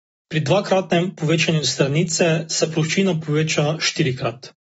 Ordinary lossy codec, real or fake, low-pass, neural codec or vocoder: AAC, 24 kbps; real; 19.8 kHz; none